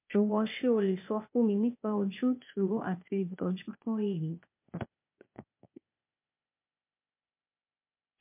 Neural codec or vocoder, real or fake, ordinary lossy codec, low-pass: codec, 16 kHz, 0.8 kbps, ZipCodec; fake; MP3, 32 kbps; 3.6 kHz